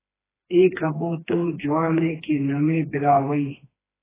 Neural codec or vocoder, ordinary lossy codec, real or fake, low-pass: codec, 16 kHz, 2 kbps, FreqCodec, smaller model; AAC, 16 kbps; fake; 3.6 kHz